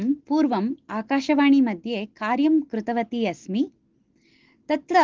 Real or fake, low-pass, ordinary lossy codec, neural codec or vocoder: real; 7.2 kHz; Opus, 16 kbps; none